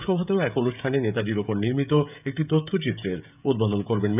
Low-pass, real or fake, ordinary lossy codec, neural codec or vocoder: 3.6 kHz; fake; none; codec, 16 kHz, 16 kbps, FreqCodec, smaller model